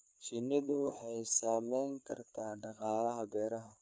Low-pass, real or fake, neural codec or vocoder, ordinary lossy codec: none; fake; codec, 16 kHz, 4 kbps, FreqCodec, larger model; none